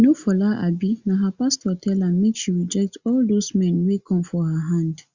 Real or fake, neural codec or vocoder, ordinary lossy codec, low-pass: real; none; Opus, 64 kbps; 7.2 kHz